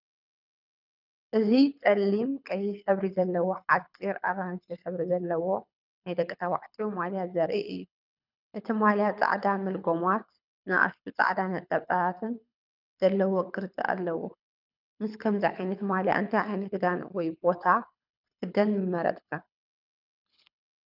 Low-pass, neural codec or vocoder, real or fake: 5.4 kHz; codec, 24 kHz, 6 kbps, HILCodec; fake